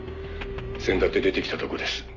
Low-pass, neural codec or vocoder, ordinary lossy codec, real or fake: 7.2 kHz; none; none; real